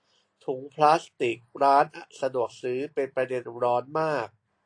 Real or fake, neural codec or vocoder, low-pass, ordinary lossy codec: real; none; 9.9 kHz; AAC, 48 kbps